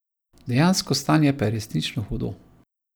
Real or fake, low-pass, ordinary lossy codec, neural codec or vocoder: real; none; none; none